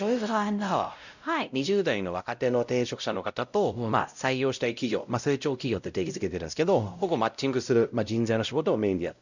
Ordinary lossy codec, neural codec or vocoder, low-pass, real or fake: none; codec, 16 kHz, 0.5 kbps, X-Codec, WavLM features, trained on Multilingual LibriSpeech; 7.2 kHz; fake